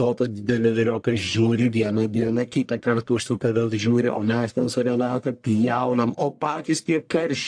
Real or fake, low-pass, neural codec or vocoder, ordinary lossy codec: fake; 9.9 kHz; codec, 44.1 kHz, 1.7 kbps, Pupu-Codec; AAC, 64 kbps